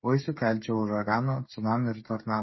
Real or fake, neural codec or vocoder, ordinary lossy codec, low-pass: fake; codec, 16 kHz, 8 kbps, FreqCodec, smaller model; MP3, 24 kbps; 7.2 kHz